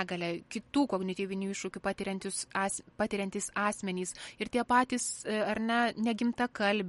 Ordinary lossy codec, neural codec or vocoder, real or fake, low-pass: MP3, 48 kbps; none; real; 19.8 kHz